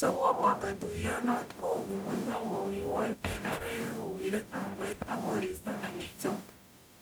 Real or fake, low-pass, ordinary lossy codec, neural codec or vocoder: fake; none; none; codec, 44.1 kHz, 0.9 kbps, DAC